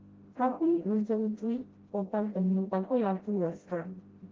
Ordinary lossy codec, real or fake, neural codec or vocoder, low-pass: Opus, 16 kbps; fake; codec, 16 kHz, 0.5 kbps, FreqCodec, smaller model; 7.2 kHz